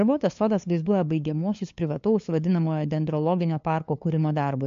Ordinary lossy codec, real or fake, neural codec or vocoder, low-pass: MP3, 48 kbps; fake; codec, 16 kHz, 2 kbps, FunCodec, trained on LibriTTS, 25 frames a second; 7.2 kHz